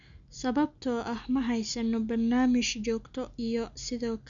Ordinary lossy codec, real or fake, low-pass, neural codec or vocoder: none; real; 7.2 kHz; none